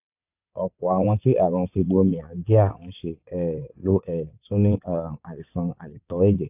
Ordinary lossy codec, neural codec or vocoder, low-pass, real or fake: AAC, 32 kbps; vocoder, 22.05 kHz, 80 mel bands, WaveNeXt; 3.6 kHz; fake